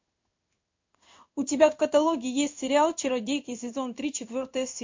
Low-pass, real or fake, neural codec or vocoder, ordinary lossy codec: 7.2 kHz; fake; codec, 16 kHz in and 24 kHz out, 1 kbps, XY-Tokenizer; MP3, 48 kbps